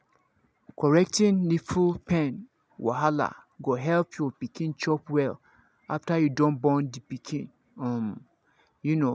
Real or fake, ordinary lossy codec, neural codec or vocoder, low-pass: real; none; none; none